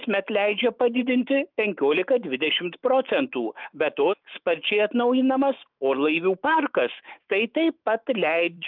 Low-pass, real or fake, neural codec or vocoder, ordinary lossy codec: 5.4 kHz; fake; autoencoder, 48 kHz, 128 numbers a frame, DAC-VAE, trained on Japanese speech; Opus, 24 kbps